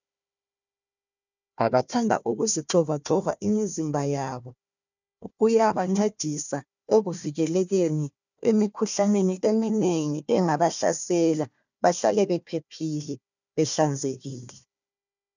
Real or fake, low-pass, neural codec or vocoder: fake; 7.2 kHz; codec, 16 kHz, 1 kbps, FunCodec, trained on Chinese and English, 50 frames a second